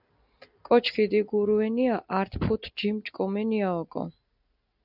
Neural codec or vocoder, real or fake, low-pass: none; real; 5.4 kHz